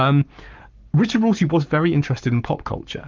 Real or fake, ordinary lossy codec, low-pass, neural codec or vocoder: fake; Opus, 24 kbps; 7.2 kHz; codec, 44.1 kHz, 7.8 kbps, Pupu-Codec